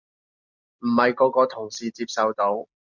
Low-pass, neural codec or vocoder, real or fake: 7.2 kHz; none; real